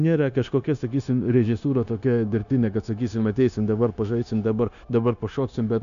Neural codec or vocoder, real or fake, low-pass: codec, 16 kHz, 0.9 kbps, LongCat-Audio-Codec; fake; 7.2 kHz